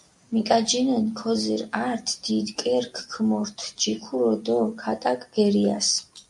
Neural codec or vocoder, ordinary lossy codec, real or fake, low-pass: none; MP3, 64 kbps; real; 10.8 kHz